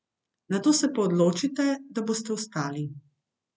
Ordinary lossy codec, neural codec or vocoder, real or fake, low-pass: none; none; real; none